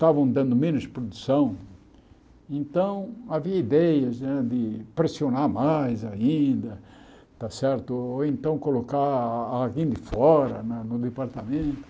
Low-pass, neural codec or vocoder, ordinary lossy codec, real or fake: none; none; none; real